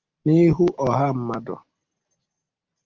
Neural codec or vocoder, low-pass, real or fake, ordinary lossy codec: none; 7.2 kHz; real; Opus, 24 kbps